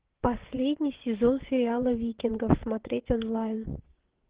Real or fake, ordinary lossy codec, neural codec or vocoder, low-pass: fake; Opus, 16 kbps; vocoder, 22.05 kHz, 80 mel bands, WaveNeXt; 3.6 kHz